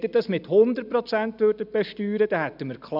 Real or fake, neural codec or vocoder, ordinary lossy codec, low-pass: real; none; none; 5.4 kHz